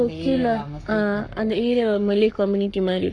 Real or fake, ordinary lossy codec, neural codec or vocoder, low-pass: fake; none; codec, 44.1 kHz, 7.8 kbps, Pupu-Codec; 9.9 kHz